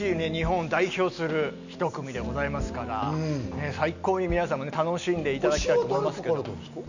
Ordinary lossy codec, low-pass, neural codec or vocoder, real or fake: none; 7.2 kHz; none; real